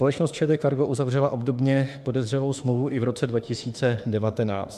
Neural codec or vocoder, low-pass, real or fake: autoencoder, 48 kHz, 32 numbers a frame, DAC-VAE, trained on Japanese speech; 14.4 kHz; fake